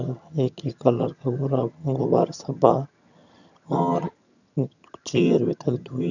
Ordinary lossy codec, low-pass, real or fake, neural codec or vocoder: none; 7.2 kHz; fake; vocoder, 22.05 kHz, 80 mel bands, HiFi-GAN